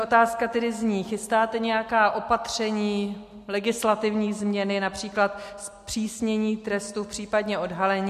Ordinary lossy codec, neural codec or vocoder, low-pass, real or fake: MP3, 64 kbps; none; 14.4 kHz; real